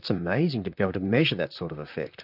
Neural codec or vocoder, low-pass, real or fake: vocoder, 44.1 kHz, 128 mel bands, Pupu-Vocoder; 5.4 kHz; fake